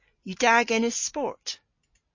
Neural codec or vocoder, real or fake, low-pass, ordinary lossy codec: none; real; 7.2 kHz; MP3, 32 kbps